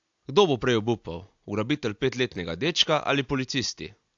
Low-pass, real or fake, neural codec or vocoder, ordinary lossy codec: 7.2 kHz; real; none; AAC, 64 kbps